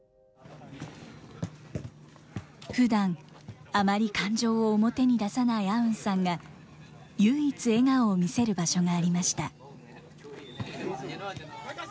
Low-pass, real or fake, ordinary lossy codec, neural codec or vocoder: none; real; none; none